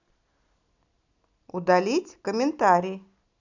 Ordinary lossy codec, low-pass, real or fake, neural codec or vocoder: none; 7.2 kHz; real; none